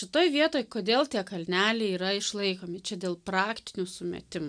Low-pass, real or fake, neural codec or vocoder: 9.9 kHz; real; none